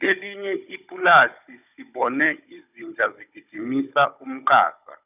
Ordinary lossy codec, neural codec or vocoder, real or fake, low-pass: none; codec, 16 kHz, 16 kbps, FunCodec, trained on Chinese and English, 50 frames a second; fake; 3.6 kHz